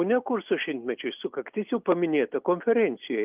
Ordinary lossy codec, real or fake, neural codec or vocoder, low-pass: Opus, 32 kbps; real; none; 3.6 kHz